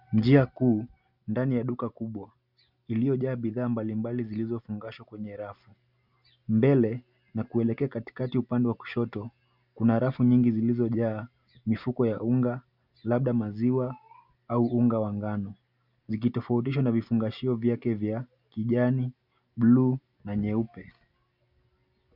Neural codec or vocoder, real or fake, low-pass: none; real; 5.4 kHz